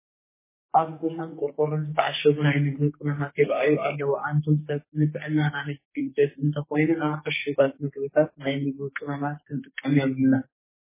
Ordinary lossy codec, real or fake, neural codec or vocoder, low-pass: MP3, 16 kbps; fake; codec, 44.1 kHz, 2.6 kbps, SNAC; 3.6 kHz